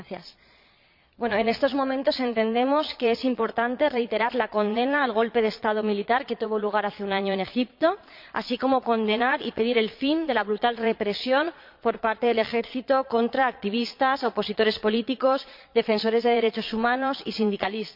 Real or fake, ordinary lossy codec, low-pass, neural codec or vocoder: fake; MP3, 48 kbps; 5.4 kHz; vocoder, 22.05 kHz, 80 mel bands, Vocos